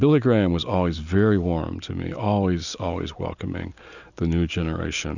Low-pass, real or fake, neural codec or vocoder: 7.2 kHz; real; none